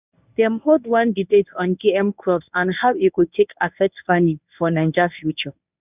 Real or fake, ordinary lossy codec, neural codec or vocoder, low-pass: fake; none; codec, 24 kHz, 0.9 kbps, WavTokenizer, medium speech release version 1; 3.6 kHz